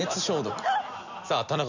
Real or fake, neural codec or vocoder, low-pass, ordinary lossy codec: real; none; 7.2 kHz; none